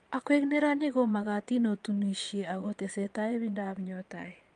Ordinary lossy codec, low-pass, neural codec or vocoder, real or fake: Opus, 32 kbps; 9.9 kHz; vocoder, 22.05 kHz, 80 mel bands, Vocos; fake